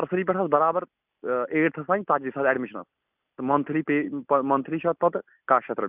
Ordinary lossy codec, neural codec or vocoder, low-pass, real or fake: none; none; 3.6 kHz; real